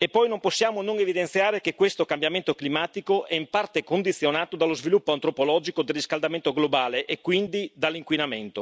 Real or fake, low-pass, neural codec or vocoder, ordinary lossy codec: real; none; none; none